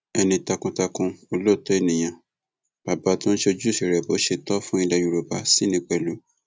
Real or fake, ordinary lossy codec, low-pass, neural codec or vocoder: real; none; none; none